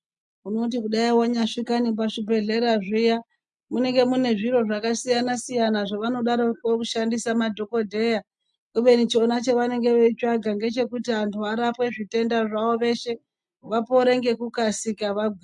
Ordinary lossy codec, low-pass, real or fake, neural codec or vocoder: MP3, 64 kbps; 10.8 kHz; real; none